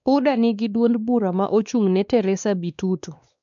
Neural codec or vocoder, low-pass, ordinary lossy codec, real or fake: codec, 16 kHz, 2 kbps, X-Codec, WavLM features, trained on Multilingual LibriSpeech; 7.2 kHz; none; fake